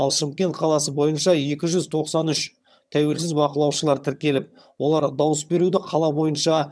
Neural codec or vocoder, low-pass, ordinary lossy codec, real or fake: vocoder, 22.05 kHz, 80 mel bands, HiFi-GAN; none; none; fake